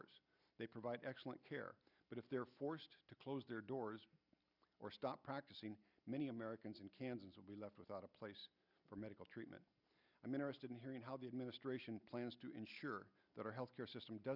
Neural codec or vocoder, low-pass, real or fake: none; 5.4 kHz; real